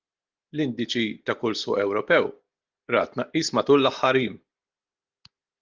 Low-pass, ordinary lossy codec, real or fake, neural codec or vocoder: 7.2 kHz; Opus, 32 kbps; real; none